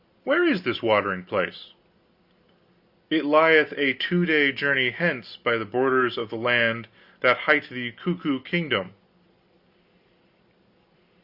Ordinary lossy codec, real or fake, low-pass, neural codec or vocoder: Opus, 64 kbps; real; 5.4 kHz; none